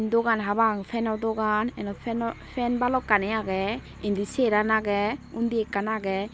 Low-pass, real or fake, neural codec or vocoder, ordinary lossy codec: none; real; none; none